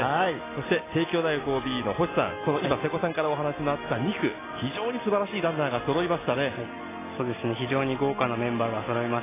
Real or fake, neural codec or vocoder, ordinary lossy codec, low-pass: real; none; AAC, 16 kbps; 3.6 kHz